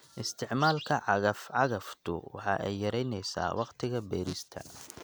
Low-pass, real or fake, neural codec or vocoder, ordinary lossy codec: none; real; none; none